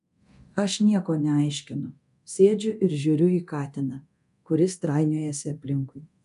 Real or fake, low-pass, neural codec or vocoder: fake; 10.8 kHz; codec, 24 kHz, 0.9 kbps, DualCodec